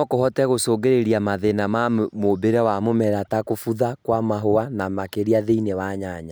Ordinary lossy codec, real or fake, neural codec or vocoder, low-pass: none; real; none; none